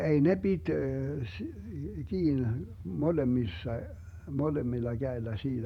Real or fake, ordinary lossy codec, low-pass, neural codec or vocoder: fake; none; 19.8 kHz; vocoder, 48 kHz, 128 mel bands, Vocos